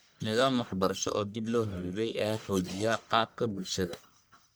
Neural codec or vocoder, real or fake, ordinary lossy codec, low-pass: codec, 44.1 kHz, 1.7 kbps, Pupu-Codec; fake; none; none